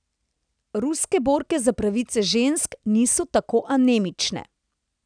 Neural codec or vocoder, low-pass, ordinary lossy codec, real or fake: none; 9.9 kHz; none; real